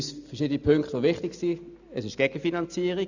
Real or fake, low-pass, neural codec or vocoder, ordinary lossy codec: real; 7.2 kHz; none; none